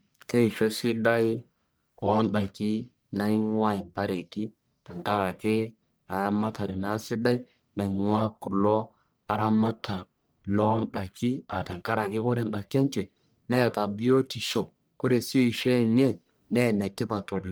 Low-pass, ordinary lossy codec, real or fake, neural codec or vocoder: none; none; fake; codec, 44.1 kHz, 1.7 kbps, Pupu-Codec